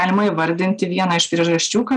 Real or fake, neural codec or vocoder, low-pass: real; none; 9.9 kHz